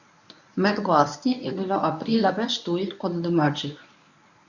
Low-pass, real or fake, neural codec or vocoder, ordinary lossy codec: 7.2 kHz; fake; codec, 24 kHz, 0.9 kbps, WavTokenizer, medium speech release version 1; none